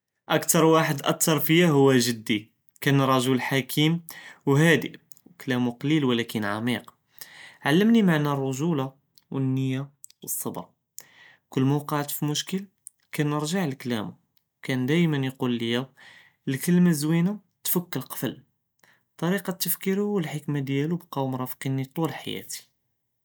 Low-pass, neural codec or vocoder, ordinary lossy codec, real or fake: none; none; none; real